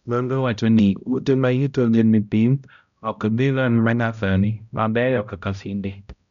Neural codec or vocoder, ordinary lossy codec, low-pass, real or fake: codec, 16 kHz, 0.5 kbps, X-Codec, HuBERT features, trained on balanced general audio; none; 7.2 kHz; fake